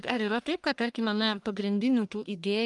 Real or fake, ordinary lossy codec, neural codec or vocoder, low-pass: fake; Opus, 32 kbps; codec, 44.1 kHz, 1.7 kbps, Pupu-Codec; 10.8 kHz